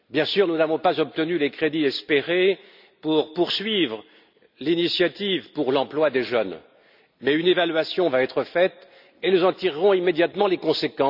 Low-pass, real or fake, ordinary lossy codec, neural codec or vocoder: 5.4 kHz; real; none; none